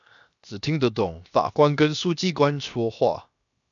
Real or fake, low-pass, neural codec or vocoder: fake; 7.2 kHz; codec, 16 kHz, 0.7 kbps, FocalCodec